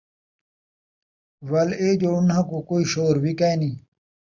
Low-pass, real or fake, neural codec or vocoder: 7.2 kHz; real; none